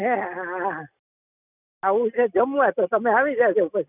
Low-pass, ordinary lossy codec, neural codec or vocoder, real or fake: 3.6 kHz; none; none; real